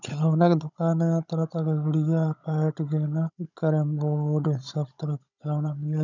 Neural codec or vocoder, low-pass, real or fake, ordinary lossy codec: codec, 16 kHz, 16 kbps, FunCodec, trained on Chinese and English, 50 frames a second; 7.2 kHz; fake; none